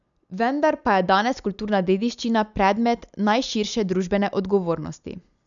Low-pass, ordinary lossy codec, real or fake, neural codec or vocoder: 7.2 kHz; none; real; none